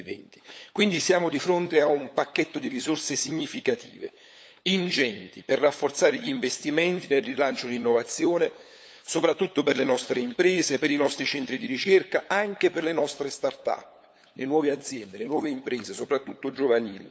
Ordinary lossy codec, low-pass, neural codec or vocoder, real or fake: none; none; codec, 16 kHz, 8 kbps, FunCodec, trained on LibriTTS, 25 frames a second; fake